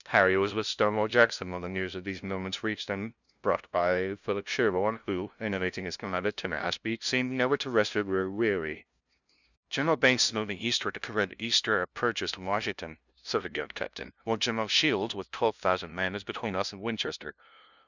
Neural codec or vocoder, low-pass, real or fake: codec, 16 kHz, 0.5 kbps, FunCodec, trained on LibriTTS, 25 frames a second; 7.2 kHz; fake